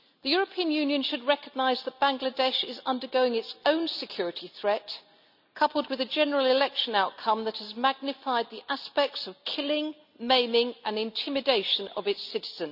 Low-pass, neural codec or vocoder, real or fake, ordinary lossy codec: 5.4 kHz; none; real; none